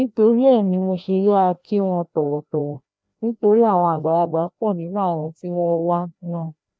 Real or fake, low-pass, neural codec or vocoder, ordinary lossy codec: fake; none; codec, 16 kHz, 1 kbps, FreqCodec, larger model; none